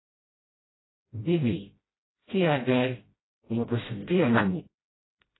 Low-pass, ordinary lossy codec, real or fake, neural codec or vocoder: 7.2 kHz; AAC, 16 kbps; fake; codec, 16 kHz, 0.5 kbps, FreqCodec, smaller model